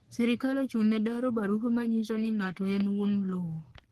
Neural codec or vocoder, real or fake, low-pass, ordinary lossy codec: codec, 44.1 kHz, 3.4 kbps, Pupu-Codec; fake; 14.4 kHz; Opus, 16 kbps